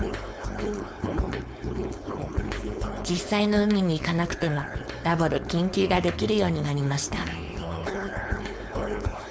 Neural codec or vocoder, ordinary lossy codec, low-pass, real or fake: codec, 16 kHz, 4.8 kbps, FACodec; none; none; fake